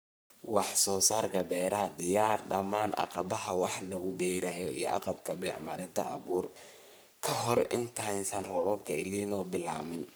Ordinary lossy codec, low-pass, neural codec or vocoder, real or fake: none; none; codec, 44.1 kHz, 3.4 kbps, Pupu-Codec; fake